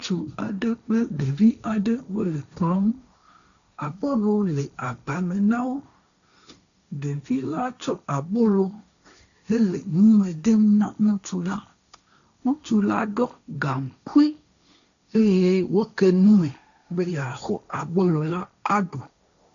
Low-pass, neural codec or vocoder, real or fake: 7.2 kHz; codec, 16 kHz, 1.1 kbps, Voila-Tokenizer; fake